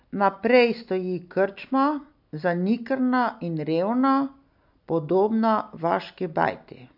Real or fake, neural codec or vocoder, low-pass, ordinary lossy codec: real; none; 5.4 kHz; AAC, 48 kbps